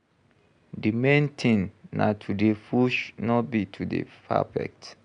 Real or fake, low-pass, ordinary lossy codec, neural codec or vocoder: real; 9.9 kHz; MP3, 96 kbps; none